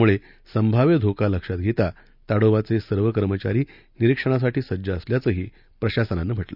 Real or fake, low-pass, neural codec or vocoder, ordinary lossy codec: real; 5.4 kHz; none; none